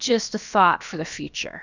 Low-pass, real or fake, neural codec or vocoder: 7.2 kHz; fake; codec, 16 kHz, about 1 kbps, DyCAST, with the encoder's durations